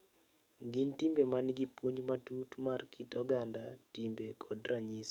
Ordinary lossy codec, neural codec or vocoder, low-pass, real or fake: none; codec, 44.1 kHz, 7.8 kbps, DAC; 19.8 kHz; fake